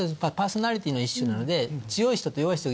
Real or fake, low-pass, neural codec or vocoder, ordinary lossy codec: real; none; none; none